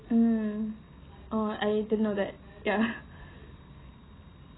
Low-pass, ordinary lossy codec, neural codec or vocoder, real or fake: 7.2 kHz; AAC, 16 kbps; none; real